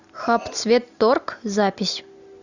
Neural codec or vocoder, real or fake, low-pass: none; real; 7.2 kHz